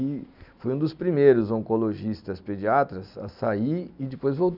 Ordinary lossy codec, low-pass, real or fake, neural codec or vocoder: none; 5.4 kHz; real; none